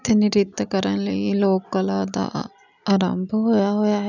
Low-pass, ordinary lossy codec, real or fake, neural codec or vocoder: 7.2 kHz; none; real; none